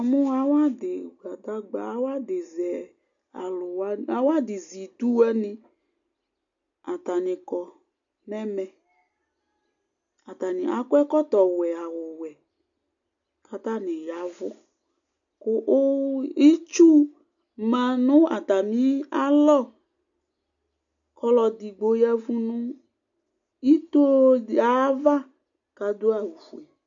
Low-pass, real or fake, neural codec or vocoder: 7.2 kHz; real; none